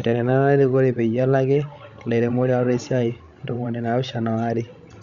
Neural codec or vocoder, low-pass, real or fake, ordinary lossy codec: codec, 16 kHz, 16 kbps, FreqCodec, larger model; 7.2 kHz; fake; Opus, 64 kbps